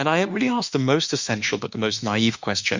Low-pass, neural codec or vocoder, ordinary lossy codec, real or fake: 7.2 kHz; autoencoder, 48 kHz, 32 numbers a frame, DAC-VAE, trained on Japanese speech; Opus, 64 kbps; fake